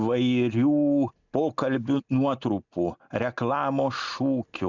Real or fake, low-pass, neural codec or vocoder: real; 7.2 kHz; none